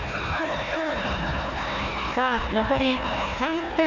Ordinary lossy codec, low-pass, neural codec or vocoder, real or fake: none; 7.2 kHz; codec, 16 kHz, 1 kbps, FunCodec, trained on Chinese and English, 50 frames a second; fake